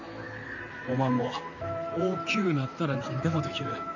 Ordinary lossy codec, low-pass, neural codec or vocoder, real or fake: none; 7.2 kHz; vocoder, 44.1 kHz, 128 mel bands, Pupu-Vocoder; fake